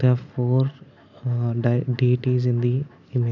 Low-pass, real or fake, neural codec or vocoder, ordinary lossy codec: 7.2 kHz; real; none; none